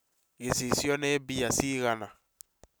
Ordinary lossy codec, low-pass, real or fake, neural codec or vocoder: none; none; real; none